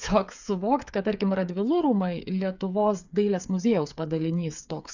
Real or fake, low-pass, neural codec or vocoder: fake; 7.2 kHz; codec, 16 kHz, 8 kbps, FreqCodec, smaller model